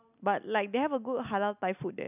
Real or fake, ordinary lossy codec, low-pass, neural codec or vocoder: real; none; 3.6 kHz; none